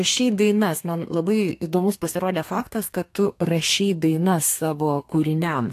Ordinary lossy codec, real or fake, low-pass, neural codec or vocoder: AAC, 64 kbps; fake; 14.4 kHz; codec, 32 kHz, 1.9 kbps, SNAC